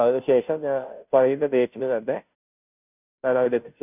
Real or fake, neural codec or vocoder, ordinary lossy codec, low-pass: fake; codec, 16 kHz, 0.5 kbps, FunCodec, trained on Chinese and English, 25 frames a second; none; 3.6 kHz